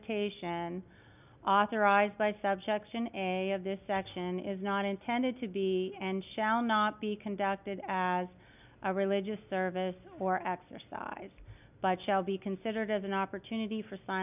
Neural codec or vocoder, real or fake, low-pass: none; real; 3.6 kHz